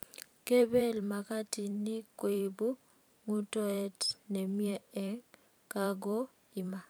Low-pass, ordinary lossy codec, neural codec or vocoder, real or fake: none; none; vocoder, 44.1 kHz, 128 mel bands every 512 samples, BigVGAN v2; fake